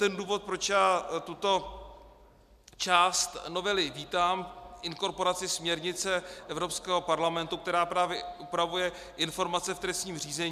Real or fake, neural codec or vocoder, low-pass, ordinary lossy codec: real; none; 14.4 kHz; MP3, 96 kbps